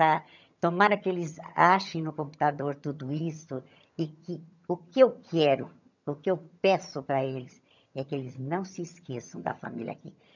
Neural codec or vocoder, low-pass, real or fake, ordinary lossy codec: vocoder, 22.05 kHz, 80 mel bands, HiFi-GAN; 7.2 kHz; fake; none